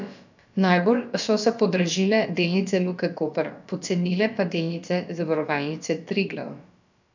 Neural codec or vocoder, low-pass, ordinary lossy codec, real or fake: codec, 16 kHz, about 1 kbps, DyCAST, with the encoder's durations; 7.2 kHz; none; fake